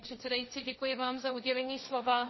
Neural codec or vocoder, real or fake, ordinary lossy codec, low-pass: codec, 16 kHz, 1.1 kbps, Voila-Tokenizer; fake; MP3, 24 kbps; 7.2 kHz